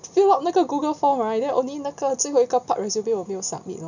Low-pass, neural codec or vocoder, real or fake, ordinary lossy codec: 7.2 kHz; none; real; none